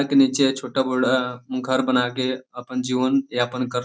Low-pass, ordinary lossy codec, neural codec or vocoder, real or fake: none; none; none; real